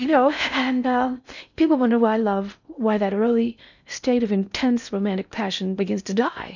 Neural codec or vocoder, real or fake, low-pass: codec, 16 kHz in and 24 kHz out, 0.6 kbps, FocalCodec, streaming, 4096 codes; fake; 7.2 kHz